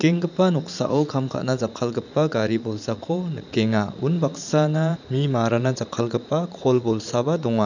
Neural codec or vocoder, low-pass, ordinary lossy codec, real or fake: vocoder, 44.1 kHz, 80 mel bands, Vocos; 7.2 kHz; none; fake